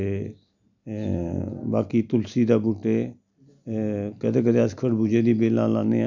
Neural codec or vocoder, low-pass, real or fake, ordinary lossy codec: none; 7.2 kHz; real; none